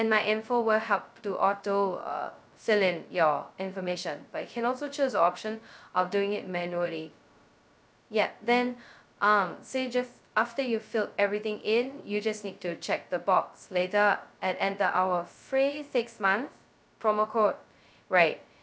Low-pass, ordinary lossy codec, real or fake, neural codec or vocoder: none; none; fake; codec, 16 kHz, 0.2 kbps, FocalCodec